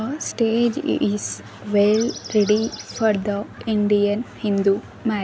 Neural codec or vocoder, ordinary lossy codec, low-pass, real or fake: none; none; none; real